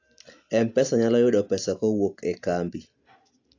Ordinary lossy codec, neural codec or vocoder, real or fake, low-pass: AAC, 48 kbps; none; real; 7.2 kHz